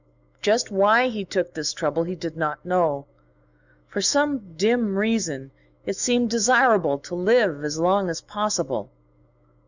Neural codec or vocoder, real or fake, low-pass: autoencoder, 48 kHz, 128 numbers a frame, DAC-VAE, trained on Japanese speech; fake; 7.2 kHz